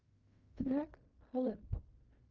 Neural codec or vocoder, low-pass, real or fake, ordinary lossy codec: codec, 16 kHz in and 24 kHz out, 0.4 kbps, LongCat-Audio-Codec, fine tuned four codebook decoder; 7.2 kHz; fake; Opus, 32 kbps